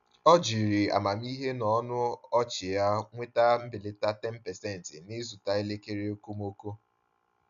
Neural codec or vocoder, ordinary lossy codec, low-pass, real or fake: none; MP3, 96 kbps; 7.2 kHz; real